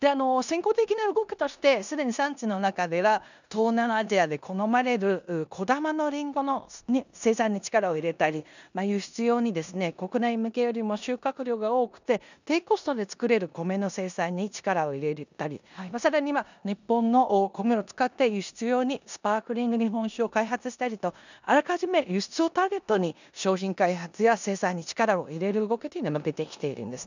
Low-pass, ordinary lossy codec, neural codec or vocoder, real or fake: 7.2 kHz; none; codec, 16 kHz in and 24 kHz out, 0.9 kbps, LongCat-Audio-Codec, four codebook decoder; fake